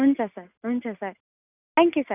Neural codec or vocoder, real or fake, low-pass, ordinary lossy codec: none; real; 3.6 kHz; none